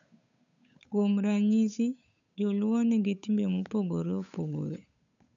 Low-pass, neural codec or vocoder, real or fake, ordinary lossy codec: 7.2 kHz; codec, 16 kHz, 8 kbps, FunCodec, trained on Chinese and English, 25 frames a second; fake; none